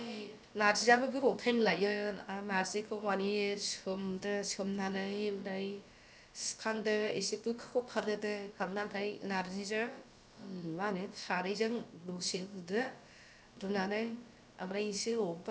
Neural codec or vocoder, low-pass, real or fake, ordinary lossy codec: codec, 16 kHz, about 1 kbps, DyCAST, with the encoder's durations; none; fake; none